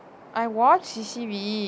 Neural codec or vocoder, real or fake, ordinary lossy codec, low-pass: none; real; none; none